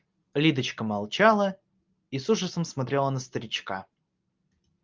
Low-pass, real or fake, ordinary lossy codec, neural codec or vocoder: 7.2 kHz; real; Opus, 24 kbps; none